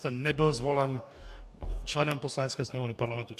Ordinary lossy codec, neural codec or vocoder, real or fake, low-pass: AAC, 96 kbps; codec, 44.1 kHz, 2.6 kbps, DAC; fake; 14.4 kHz